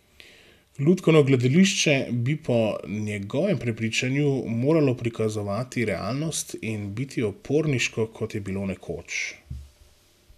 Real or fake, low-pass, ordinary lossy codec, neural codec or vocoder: real; 14.4 kHz; none; none